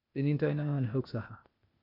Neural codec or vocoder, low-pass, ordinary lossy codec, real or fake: codec, 16 kHz, 0.8 kbps, ZipCodec; 5.4 kHz; none; fake